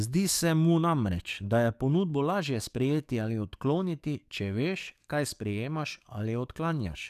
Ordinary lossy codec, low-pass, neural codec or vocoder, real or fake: none; 14.4 kHz; codec, 44.1 kHz, 7.8 kbps, DAC; fake